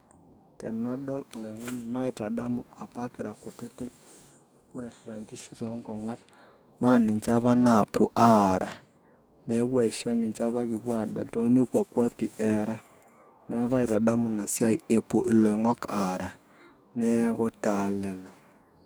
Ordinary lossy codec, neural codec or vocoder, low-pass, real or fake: none; codec, 44.1 kHz, 2.6 kbps, DAC; none; fake